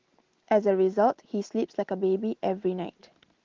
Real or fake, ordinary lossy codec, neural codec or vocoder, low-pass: real; Opus, 16 kbps; none; 7.2 kHz